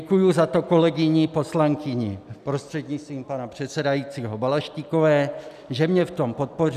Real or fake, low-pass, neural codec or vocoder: real; 14.4 kHz; none